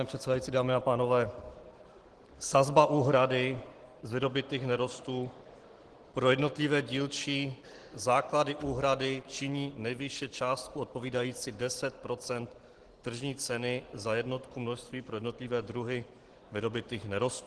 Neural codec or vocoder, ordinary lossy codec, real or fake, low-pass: none; Opus, 16 kbps; real; 10.8 kHz